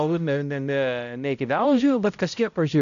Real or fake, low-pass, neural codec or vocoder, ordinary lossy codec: fake; 7.2 kHz; codec, 16 kHz, 0.5 kbps, X-Codec, HuBERT features, trained on balanced general audio; MP3, 96 kbps